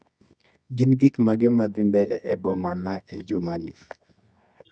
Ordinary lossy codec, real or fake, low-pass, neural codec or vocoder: none; fake; 9.9 kHz; codec, 24 kHz, 0.9 kbps, WavTokenizer, medium music audio release